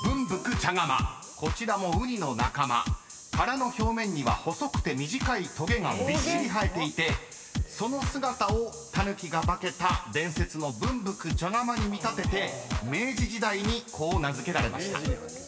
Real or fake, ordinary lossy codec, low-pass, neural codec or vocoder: real; none; none; none